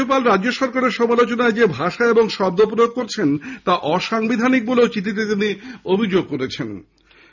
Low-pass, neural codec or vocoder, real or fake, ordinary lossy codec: 7.2 kHz; none; real; none